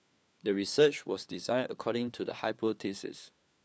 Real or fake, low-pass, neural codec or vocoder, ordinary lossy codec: fake; none; codec, 16 kHz, 4 kbps, FunCodec, trained on LibriTTS, 50 frames a second; none